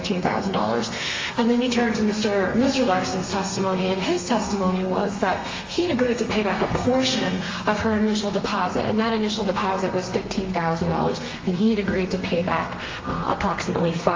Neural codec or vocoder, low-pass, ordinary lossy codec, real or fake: codec, 32 kHz, 1.9 kbps, SNAC; 7.2 kHz; Opus, 32 kbps; fake